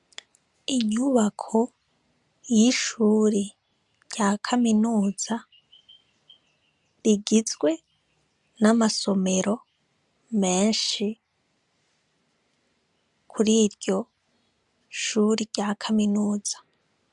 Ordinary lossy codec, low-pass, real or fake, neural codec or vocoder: MP3, 96 kbps; 10.8 kHz; real; none